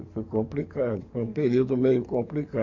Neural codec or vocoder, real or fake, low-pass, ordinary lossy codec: codec, 44.1 kHz, 7.8 kbps, DAC; fake; 7.2 kHz; MP3, 64 kbps